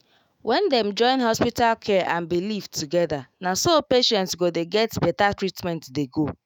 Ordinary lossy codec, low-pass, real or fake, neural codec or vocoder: none; none; fake; autoencoder, 48 kHz, 128 numbers a frame, DAC-VAE, trained on Japanese speech